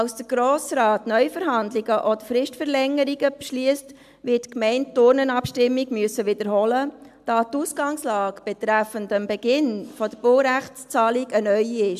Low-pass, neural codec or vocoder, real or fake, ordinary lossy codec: 14.4 kHz; none; real; AAC, 96 kbps